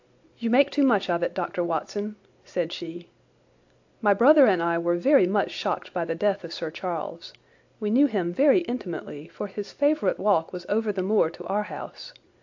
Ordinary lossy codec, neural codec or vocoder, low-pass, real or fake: AAC, 48 kbps; none; 7.2 kHz; real